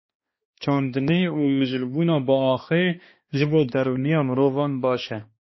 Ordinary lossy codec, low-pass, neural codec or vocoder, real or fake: MP3, 24 kbps; 7.2 kHz; codec, 16 kHz, 2 kbps, X-Codec, HuBERT features, trained on balanced general audio; fake